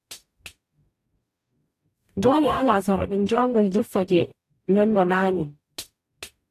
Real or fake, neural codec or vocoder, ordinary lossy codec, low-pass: fake; codec, 44.1 kHz, 0.9 kbps, DAC; AAC, 64 kbps; 14.4 kHz